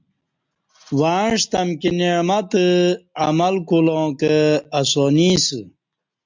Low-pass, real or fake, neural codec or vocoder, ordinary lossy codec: 7.2 kHz; real; none; MP3, 64 kbps